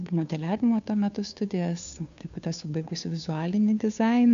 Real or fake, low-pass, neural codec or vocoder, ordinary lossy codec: fake; 7.2 kHz; codec, 16 kHz, 2 kbps, FunCodec, trained on Chinese and English, 25 frames a second; AAC, 96 kbps